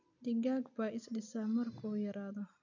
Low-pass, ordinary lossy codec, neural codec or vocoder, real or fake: 7.2 kHz; none; none; real